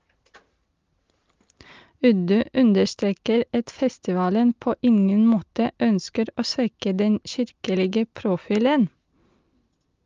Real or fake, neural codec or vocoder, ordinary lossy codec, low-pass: real; none; Opus, 32 kbps; 7.2 kHz